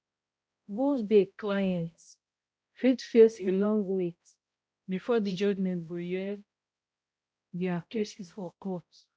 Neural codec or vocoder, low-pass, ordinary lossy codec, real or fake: codec, 16 kHz, 0.5 kbps, X-Codec, HuBERT features, trained on balanced general audio; none; none; fake